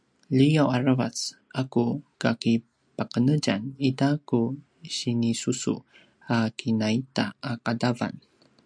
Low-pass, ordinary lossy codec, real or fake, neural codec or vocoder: 9.9 kHz; MP3, 96 kbps; real; none